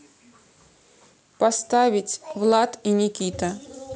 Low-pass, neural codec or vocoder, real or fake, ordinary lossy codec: none; none; real; none